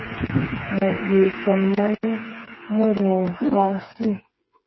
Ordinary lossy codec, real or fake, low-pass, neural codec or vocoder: MP3, 24 kbps; fake; 7.2 kHz; codec, 16 kHz, 4 kbps, FreqCodec, smaller model